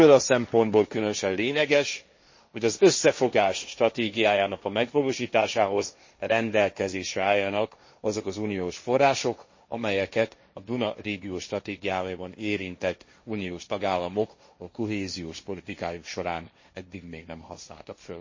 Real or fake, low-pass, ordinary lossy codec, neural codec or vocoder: fake; 7.2 kHz; MP3, 32 kbps; codec, 16 kHz, 1.1 kbps, Voila-Tokenizer